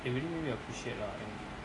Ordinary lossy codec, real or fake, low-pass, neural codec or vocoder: AAC, 32 kbps; real; 10.8 kHz; none